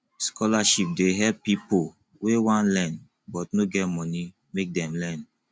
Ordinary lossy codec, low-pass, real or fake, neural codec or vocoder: none; none; real; none